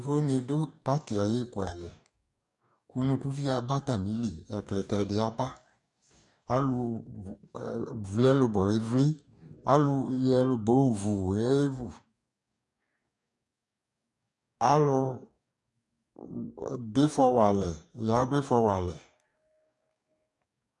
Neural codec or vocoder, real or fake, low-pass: codec, 44.1 kHz, 2.6 kbps, DAC; fake; 10.8 kHz